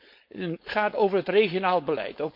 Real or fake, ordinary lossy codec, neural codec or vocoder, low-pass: fake; MP3, 32 kbps; codec, 16 kHz, 4.8 kbps, FACodec; 5.4 kHz